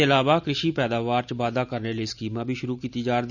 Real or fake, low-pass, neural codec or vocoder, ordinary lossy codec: real; 7.2 kHz; none; none